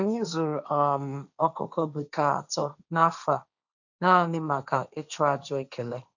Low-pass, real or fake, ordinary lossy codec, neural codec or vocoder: 7.2 kHz; fake; none; codec, 16 kHz, 1.1 kbps, Voila-Tokenizer